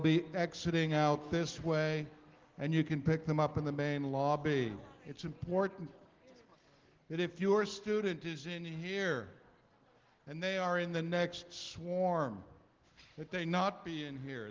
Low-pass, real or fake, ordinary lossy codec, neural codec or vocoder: 7.2 kHz; real; Opus, 16 kbps; none